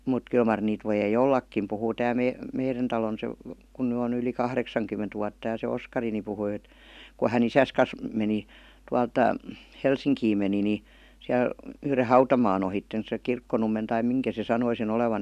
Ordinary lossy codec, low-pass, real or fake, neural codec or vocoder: none; 14.4 kHz; real; none